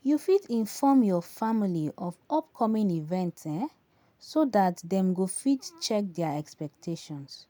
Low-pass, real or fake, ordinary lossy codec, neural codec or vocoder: none; real; none; none